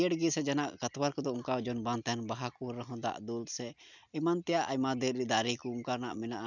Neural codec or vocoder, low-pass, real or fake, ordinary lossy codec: none; 7.2 kHz; real; none